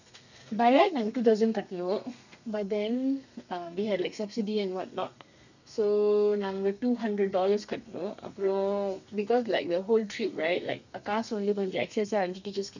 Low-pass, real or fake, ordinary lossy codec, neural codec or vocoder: 7.2 kHz; fake; none; codec, 32 kHz, 1.9 kbps, SNAC